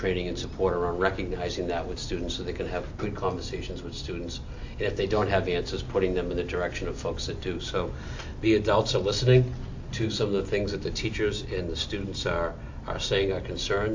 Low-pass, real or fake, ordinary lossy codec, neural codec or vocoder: 7.2 kHz; real; AAC, 48 kbps; none